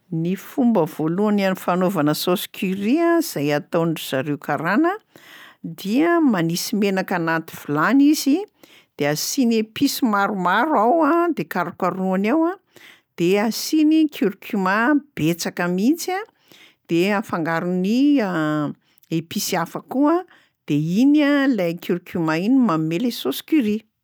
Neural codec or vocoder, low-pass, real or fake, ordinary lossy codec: none; none; real; none